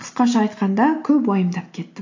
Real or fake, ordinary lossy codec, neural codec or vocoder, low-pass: real; AAC, 48 kbps; none; 7.2 kHz